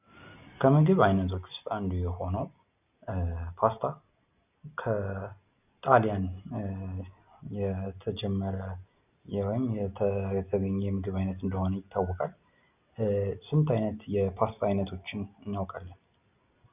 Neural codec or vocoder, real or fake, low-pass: none; real; 3.6 kHz